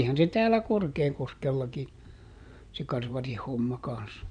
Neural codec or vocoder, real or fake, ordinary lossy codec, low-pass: vocoder, 24 kHz, 100 mel bands, Vocos; fake; none; 9.9 kHz